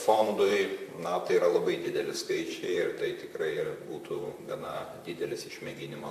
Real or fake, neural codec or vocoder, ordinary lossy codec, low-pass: fake; vocoder, 44.1 kHz, 128 mel bands, Pupu-Vocoder; AAC, 64 kbps; 14.4 kHz